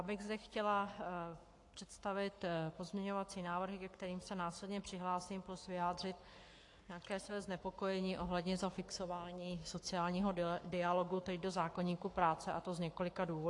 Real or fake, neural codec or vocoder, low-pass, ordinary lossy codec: fake; codec, 44.1 kHz, 7.8 kbps, Pupu-Codec; 10.8 kHz; AAC, 48 kbps